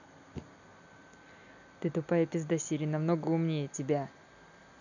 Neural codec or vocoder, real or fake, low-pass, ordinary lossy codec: none; real; 7.2 kHz; none